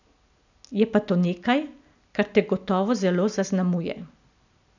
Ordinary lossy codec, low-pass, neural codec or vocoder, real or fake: none; 7.2 kHz; none; real